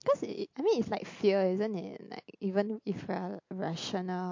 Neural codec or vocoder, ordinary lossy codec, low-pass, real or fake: none; MP3, 48 kbps; 7.2 kHz; real